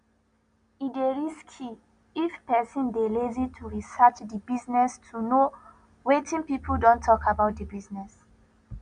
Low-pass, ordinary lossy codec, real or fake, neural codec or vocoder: 9.9 kHz; none; real; none